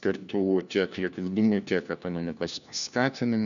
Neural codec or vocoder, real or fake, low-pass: codec, 16 kHz, 1 kbps, FunCodec, trained on LibriTTS, 50 frames a second; fake; 7.2 kHz